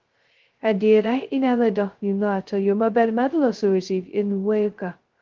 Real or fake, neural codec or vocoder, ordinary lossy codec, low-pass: fake; codec, 16 kHz, 0.2 kbps, FocalCodec; Opus, 16 kbps; 7.2 kHz